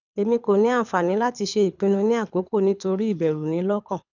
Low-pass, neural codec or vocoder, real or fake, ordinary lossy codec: 7.2 kHz; codec, 24 kHz, 6 kbps, HILCodec; fake; none